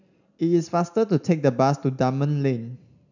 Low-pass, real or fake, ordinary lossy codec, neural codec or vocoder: 7.2 kHz; real; none; none